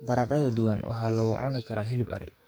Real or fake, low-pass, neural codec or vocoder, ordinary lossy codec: fake; none; codec, 44.1 kHz, 2.6 kbps, SNAC; none